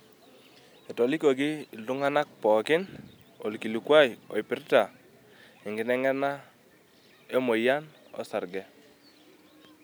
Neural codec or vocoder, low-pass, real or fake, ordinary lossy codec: none; none; real; none